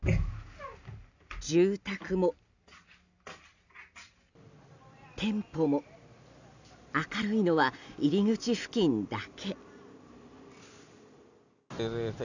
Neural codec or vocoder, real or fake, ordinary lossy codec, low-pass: none; real; none; 7.2 kHz